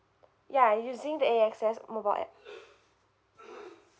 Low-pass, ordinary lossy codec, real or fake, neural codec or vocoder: none; none; real; none